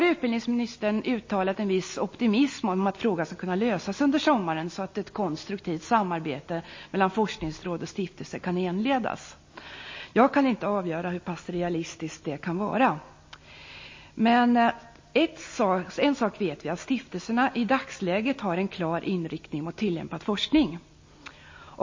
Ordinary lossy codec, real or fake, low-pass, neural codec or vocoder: MP3, 32 kbps; real; 7.2 kHz; none